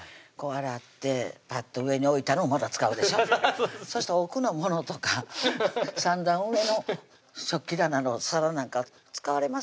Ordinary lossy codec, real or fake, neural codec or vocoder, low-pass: none; real; none; none